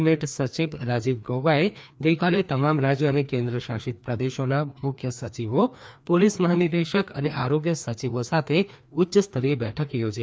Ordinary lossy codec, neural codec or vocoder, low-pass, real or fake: none; codec, 16 kHz, 2 kbps, FreqCodec, larger model; none; fake